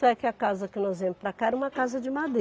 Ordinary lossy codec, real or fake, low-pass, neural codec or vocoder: none; real; none; none